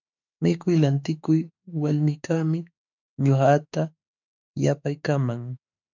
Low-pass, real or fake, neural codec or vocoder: 7.2 kHz; fake; autoencoder, 48 kHz, 32 numbers a frame, DAC-VAE, trained on Japanese speech